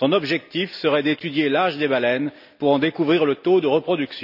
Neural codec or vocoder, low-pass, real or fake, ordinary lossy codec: none; 5.4 kHz; real; none